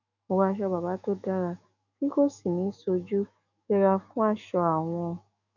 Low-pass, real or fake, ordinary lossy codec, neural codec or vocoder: 7.2 kHz; fake; none; autoencoder, 48 kHz, 128 numbers a frame, DAC-VAE, trained on Japanese speech